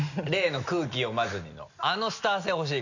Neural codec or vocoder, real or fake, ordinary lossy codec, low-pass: none; real; none; 7.2 kHz